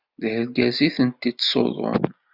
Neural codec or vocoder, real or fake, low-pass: none; real; 5.4 kHz